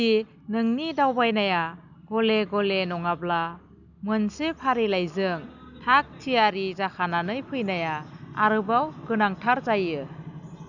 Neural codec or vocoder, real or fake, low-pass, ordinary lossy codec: none; real; 7.2 kHz; none